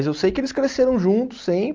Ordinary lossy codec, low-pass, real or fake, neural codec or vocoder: Opus, 24 kbps; 7.2 kHz; real; none